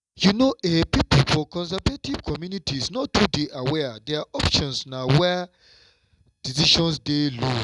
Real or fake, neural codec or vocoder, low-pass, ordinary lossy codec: real; none; 10.8 kHz; none